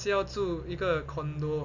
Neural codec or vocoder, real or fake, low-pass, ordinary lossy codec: none; real; 7.2 kHz; none